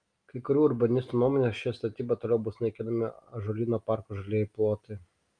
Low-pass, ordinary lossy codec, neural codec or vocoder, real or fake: 9.9 kHz; Opus, 32 kbps; none; real